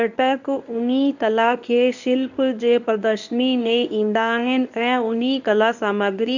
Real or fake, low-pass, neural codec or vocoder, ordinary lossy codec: fake; 7.2 kHz; codec, 24 kHz, 0.9 kbps, WavTokenizer, medium speech release version 1; none